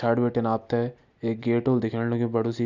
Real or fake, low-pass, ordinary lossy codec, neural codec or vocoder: real; 7.2 kHz; none; none